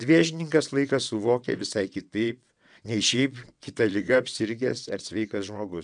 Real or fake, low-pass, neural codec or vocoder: fake; 9.9 kHz; vocoder, 22.05 kHz, 80 mel bands, WaveNeXt